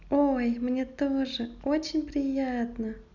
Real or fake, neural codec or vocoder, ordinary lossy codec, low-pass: real; none; none; 7.2 kHz